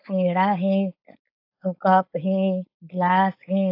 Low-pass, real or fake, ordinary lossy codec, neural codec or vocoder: 5.4 kHz; fake; AAC, 32 kbps; codec, 16 kHz, 4.8 kbps, FACodec